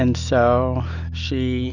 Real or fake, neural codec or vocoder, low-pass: real; none; 7.2 kHz